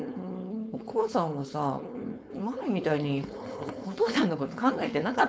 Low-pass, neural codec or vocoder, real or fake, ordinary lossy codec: none; codec, 16 kHz, 4.8 kbps, FACodec; fake; none